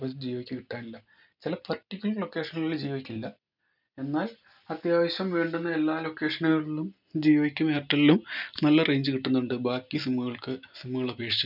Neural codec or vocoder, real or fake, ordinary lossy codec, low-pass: none; real; none; 5.4 kHz